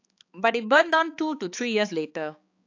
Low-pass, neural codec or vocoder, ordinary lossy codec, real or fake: 7.2 kHz; codec, 16 kHz, 4 kbps, X-Codec, HuBERT features, trained on balanced general audio; AAC, 48 kbps; fake